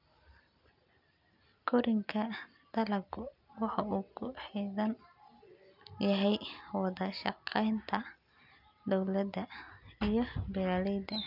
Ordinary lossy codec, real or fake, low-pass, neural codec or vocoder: none; real; 5.4 kHz; none